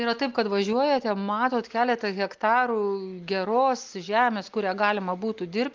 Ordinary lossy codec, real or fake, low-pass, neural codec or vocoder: Opus, 32 kbps; real; 7.2 kHz; none